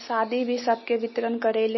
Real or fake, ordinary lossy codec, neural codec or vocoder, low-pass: real; MP3, 24 kbps; none; 7.2 kHz